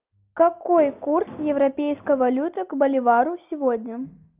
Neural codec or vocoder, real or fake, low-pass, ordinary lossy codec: none; real; 3.6 kHz; Opus, 24 kbps